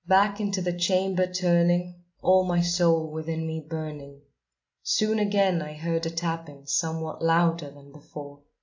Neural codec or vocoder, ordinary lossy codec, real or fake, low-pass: none; AAC, 48 kbps; real; 7.2 kHz